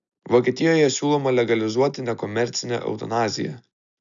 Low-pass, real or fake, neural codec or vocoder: 7.2 kHz; real; none